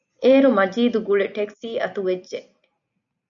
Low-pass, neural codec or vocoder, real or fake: 7.2 kHz; none; real